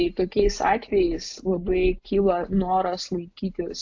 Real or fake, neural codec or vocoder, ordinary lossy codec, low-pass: real; none; AAC, 48 kbps; 7.2 kHz